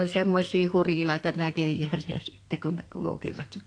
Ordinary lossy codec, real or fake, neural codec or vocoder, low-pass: AAC, 48 kbps; fake; codec, 32 kHz, 1.9 kbps, SNAC; 9.9 kHz